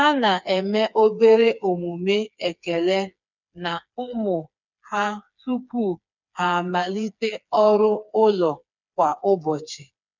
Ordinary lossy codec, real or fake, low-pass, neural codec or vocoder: none; fake; 7.2 kHz; codec, 16 kHz, 4 kbps, FreqCodec, smaller model